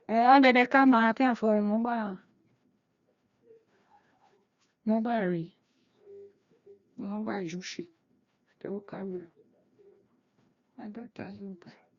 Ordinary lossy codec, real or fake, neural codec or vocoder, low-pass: Opus, 64 kbps; fake; codec, 16 kHz, 1 kbps, FreqCodec, larger model; 7.2 kHz